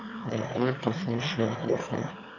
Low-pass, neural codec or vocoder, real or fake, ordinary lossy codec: 7.2 kHz; autoencoder, 22.05 kHz, a latent of 192 numbers a frame, VITS, trained on one speaker; fake; AAC, 48 kbps